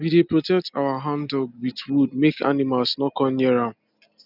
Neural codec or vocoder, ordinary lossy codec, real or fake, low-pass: none; none; real; 5.4 kHz